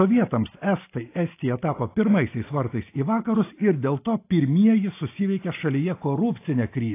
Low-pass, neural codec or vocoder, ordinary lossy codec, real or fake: 3.6 kHz; none; AAC, 24 kbps; real